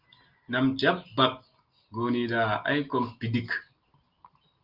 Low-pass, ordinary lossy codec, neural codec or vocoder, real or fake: 5.4 kHz; Opus, 32 kbps; none; real